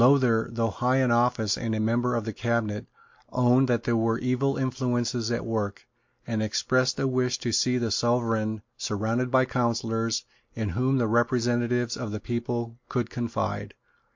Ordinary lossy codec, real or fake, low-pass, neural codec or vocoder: MP3, 48 kbps; real; 7.2 kHz; none